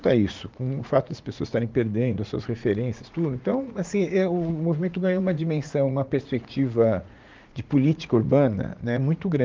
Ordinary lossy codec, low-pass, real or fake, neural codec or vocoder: Opus, 24 kbps; 7.2 kHz; fake; vocoder, 44.1 kHz, 80 mel bands, Vocos